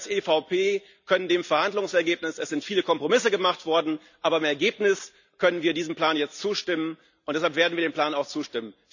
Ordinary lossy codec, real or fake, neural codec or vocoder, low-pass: none; real; none; 7.2 kHz